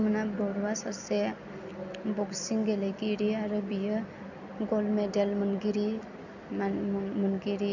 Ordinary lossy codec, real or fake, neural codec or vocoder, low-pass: none; real; none; 7.2 kHz